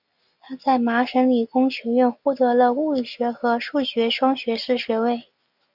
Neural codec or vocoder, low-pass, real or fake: none; 5.4 kHz; real